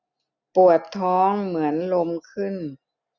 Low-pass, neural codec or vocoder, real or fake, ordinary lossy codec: 7.2 kHz; none; real; none